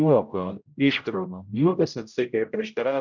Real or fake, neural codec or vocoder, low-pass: fake; codec, 16 kHz, 0.5 kbps, X-Codec, HuBERT features, trained on general audio; 7.2 kHz